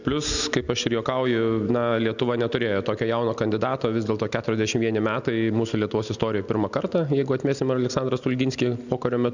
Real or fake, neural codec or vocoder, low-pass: real; none; 7.2 kHz